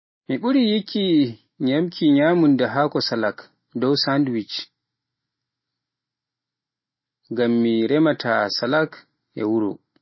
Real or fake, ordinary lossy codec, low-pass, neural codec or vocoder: real; MP3, 24 kbps; 7.2 kHz; none